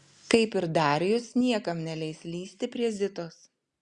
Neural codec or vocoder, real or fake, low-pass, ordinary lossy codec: none; real; 10.8 kHz; Opus, 64 kbps